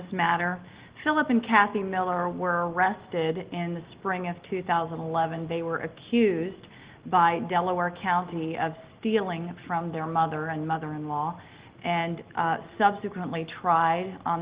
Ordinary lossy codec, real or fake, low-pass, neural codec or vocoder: Opus, 16 kbps; real; 3.6 kHz; none